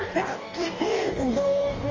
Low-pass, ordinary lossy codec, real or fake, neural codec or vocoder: 7.2 kHz; Opus, 32 kbps; fake; codec, 44.1 kHz, 2.6 kbps, DAC